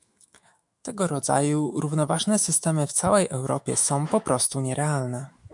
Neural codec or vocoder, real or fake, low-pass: autoencoder, 48 kHz, 128 numbers a frame, DAC-VAE, trained on Japanese speech; fake; 10.8 kHz